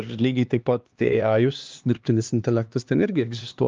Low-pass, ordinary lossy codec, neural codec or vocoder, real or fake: 7.2 kHz; Opus, 24 kbps; codec, 16 kHz, 2 kbps, X-Codec, HuBERT features, trained on LibriSpeech; fake